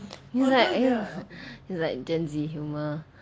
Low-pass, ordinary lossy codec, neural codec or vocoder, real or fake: none; none; none; real